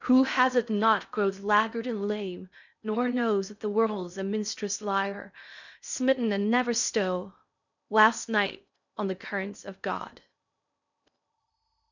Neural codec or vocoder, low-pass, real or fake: codec, 16 kHz in and 24 kHz out, 0.6 kbps, FocalCodec, streaming, 4096 codes; 7.2 kHz; fake